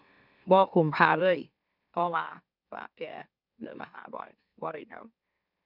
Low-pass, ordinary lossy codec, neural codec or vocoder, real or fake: 5.4 kHz; none; autoencoder, 44.1 kHz, a latent of 192 numbers a frame, MeloTTS; fake